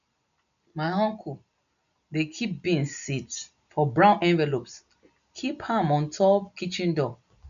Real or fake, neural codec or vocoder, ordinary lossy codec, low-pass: real; none; none; 7.2 kHz